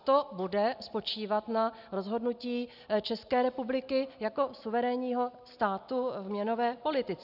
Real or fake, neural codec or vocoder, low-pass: real; none; 5.4 kHz